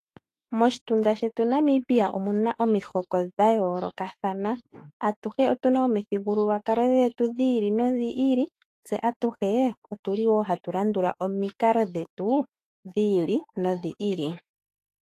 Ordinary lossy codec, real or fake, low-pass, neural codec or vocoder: AAC, 48 kbps; fake; 14.4 kHz; autoencoder, 48 kHz, 32 numbers a frame, DAC-VAE, trained on Japanese speech